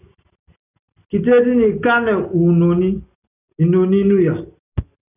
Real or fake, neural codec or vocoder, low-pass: real; none; 3.6 kHz